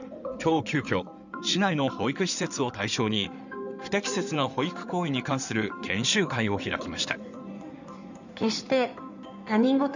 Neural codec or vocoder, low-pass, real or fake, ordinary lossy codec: codec, 16 kHz in and 24 kHz out, 2.2 kbps, FireRedTTS-2 codec; 7.2 kHz; fake; none